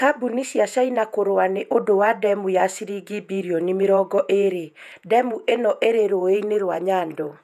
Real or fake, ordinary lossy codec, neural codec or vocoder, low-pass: fake; none; vocoder, 48 kHz, 128 mel bands, Vocos; 14.4 kHz